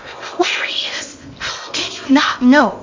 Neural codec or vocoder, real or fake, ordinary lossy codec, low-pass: codec, 16 kHz in and 24 kHz out, 0.6 kbps, FocalCodec, streaming, 2048 codes; fake; MP3, 48 kbps; 7.2 kHz